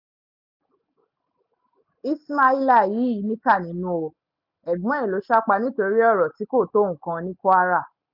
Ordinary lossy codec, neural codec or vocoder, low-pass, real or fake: none; none; 5.4 kHz; real